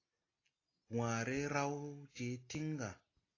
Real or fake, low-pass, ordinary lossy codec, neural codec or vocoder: real; 7.2 kHz; AAC, 32 kbps; none